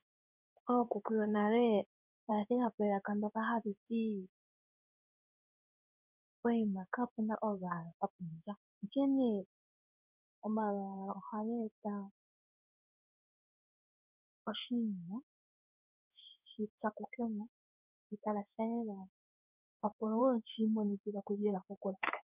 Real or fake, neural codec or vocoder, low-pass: fake; codec, 16 kHz in and 24 kHz out, 1 kbps, XY-Tokenizer; 3.6 kHz